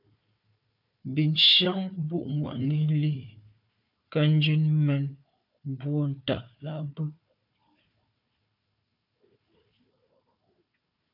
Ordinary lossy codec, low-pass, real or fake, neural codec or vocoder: MP3, 48 kbps; 5.4 kHz; fake; codec, 16 kHz, 4 kbps, FunCodec, trained on Chinese and English, 50 frames a second